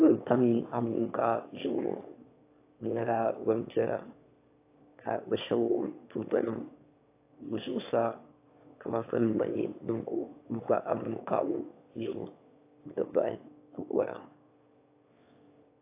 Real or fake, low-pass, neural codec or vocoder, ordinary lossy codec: fake; 3.6 kHz; autoencoder, 22.05 kHz, a latent of 192 numbers a frame, VITS, trained on one speaker; AAC, 24 kbps